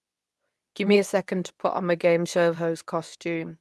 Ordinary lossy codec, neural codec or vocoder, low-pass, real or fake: none; codec, 24 kHz, 0.9 kbps, WavTokenizer, medium speech release version 2; none; fake